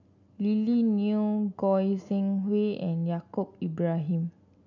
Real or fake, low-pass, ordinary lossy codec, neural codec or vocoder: real; 7.2 kHz; none; none